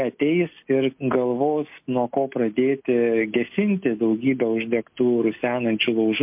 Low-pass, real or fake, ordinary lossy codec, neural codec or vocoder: 3.6 kHz; real; MP3, 32 kbps; none